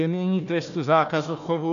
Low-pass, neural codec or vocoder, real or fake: 7.2 kHz; codec, 16 kHz, 1 kbps, FunCodec, trained on Chinese and English, 50 frames a second; fake